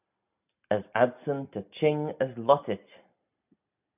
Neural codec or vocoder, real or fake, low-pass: none; real; 3.6 kHz